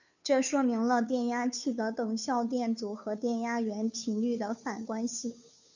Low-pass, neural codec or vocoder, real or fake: 7.2 kHz; codec, 16 kHz, 2 kbps, FunCodec, trained on Chinese and English, 25 frames a second; fake